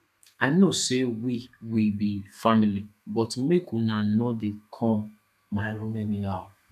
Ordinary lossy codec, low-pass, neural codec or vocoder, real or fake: none; 14.4 kHz; codec, 32 kHz, 1.9 kbps, SNAC; fake